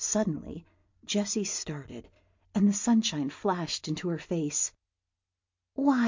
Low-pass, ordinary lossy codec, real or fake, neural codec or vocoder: 7.2 kHz; MP3, 48 kbps; real; none